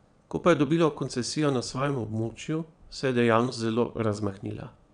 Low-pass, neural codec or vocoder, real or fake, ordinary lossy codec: 9.9 kHz; vocoder, 22.05 kHz, 80 mel bands, WaveNeXt; fake; none